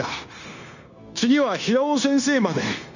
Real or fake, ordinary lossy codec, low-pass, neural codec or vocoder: fake; none; 7.2 kHz; codec, 16 kHz, 0.9 kbps, LongCat-Audio-Codec